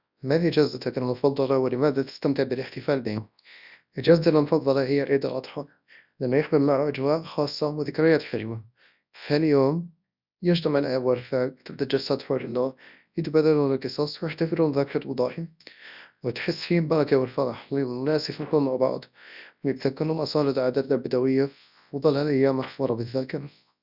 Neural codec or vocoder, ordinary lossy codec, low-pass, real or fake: codec, 24 kHz, 0.9 kbps, WavTokenizer, large speech release; none; 5.4 kHz; fake